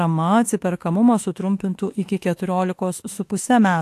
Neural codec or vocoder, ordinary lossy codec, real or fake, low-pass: autoencoder, 48 kHz, 32 numbers a frame, DAC-VAE, trained on Japanese speech; AAC, 64 kbps; fake; 14.4 kHz